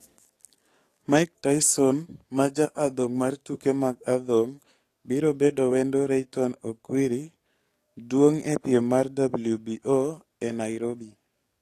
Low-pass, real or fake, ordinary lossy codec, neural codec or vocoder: 14.4 kHz; fake; AAC, 48 kbps; codec, 44.1 kHz, 7.8 kbps, DAC